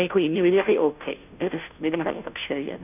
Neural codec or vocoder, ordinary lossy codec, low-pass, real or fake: codec, 16 kHz, 0.5 kbps, FunCodec, trained on Chinese and English, 25 frames a second; none; 3.6 kHz; fake